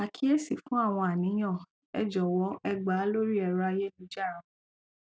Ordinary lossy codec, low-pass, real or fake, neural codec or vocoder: none; none; real; none